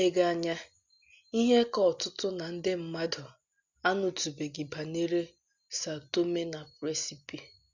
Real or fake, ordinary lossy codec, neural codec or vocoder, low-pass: real; none; none; 7.2 kHz